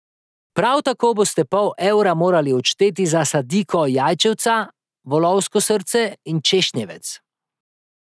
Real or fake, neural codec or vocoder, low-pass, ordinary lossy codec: real; none; none; none